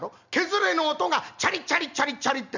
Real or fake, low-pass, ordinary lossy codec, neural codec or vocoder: real; 7.2 kHz; none; none